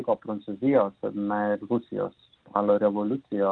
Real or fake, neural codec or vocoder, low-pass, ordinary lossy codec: real; none; 9.9 kHz; Opus, 24 kbps